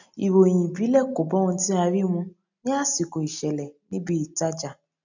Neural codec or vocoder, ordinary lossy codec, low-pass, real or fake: none; none; 7.2 kHz; real